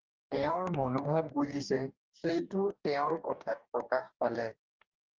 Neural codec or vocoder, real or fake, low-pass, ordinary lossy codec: codec, 44.1 kHz, 2.6 kbps, DAC; fake; 7.2 kHz; Opus, 24 kbps